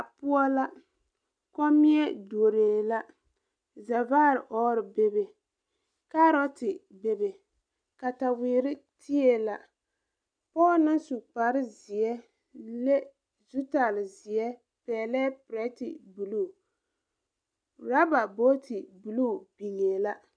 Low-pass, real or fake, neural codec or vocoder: 9.9 kHz; real; none